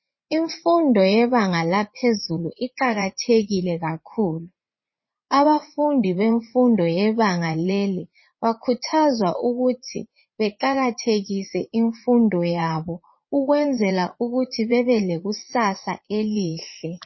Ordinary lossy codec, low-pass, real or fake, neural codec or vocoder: MP3, 24 kbps; 7.2 kHz; fake; vocoder, 44.1 kHz, 80 mel bands, Vocos